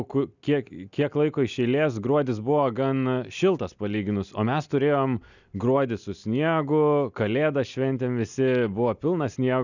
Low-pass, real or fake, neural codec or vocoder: 7.2 kHz; real; none